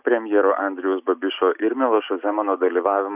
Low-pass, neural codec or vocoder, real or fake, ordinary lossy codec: 3.6 kHz; none; real; Opus, 64 kbps